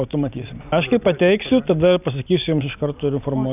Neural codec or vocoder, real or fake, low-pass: none; real; 3.6 kHz